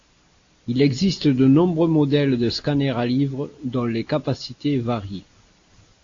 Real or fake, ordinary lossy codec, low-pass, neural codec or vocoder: real; AAC, 48 kbps; 7.2 kHz; none